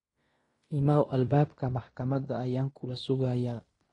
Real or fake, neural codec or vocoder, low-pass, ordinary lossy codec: fake; codec, 16 kHz in and 24 kHz out, 0.9 kbps, LongCat-Audio-Codec, fine tuned four codebook decoder; 10.8 kHz; AAC, 32 kbps